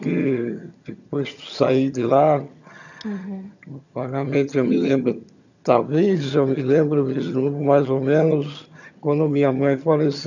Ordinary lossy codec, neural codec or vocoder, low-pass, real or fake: none; vocoder, 22.05 kHz, 80 mel bands, HiFi-GAN; 7.2 kHz; fake